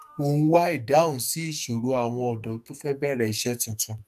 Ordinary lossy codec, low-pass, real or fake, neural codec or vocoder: none; 14.4 kHz; fake; codec, 44.1 kHz, 2.6 kbps, SNAC